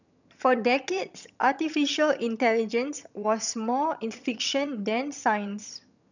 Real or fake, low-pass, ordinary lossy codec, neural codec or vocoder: fake; 7.2 kHz; none; vocoder, 22.05 kHz, 80 mel bands, HiFi-GAN